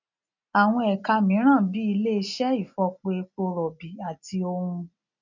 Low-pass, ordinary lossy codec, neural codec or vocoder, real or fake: 7.2 kHz; none; none; real